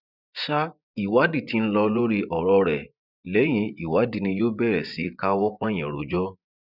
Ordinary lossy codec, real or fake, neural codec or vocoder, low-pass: none; fake; vocoder, 24 kHz, 100 mel bands, Vocos; 5.4 kHz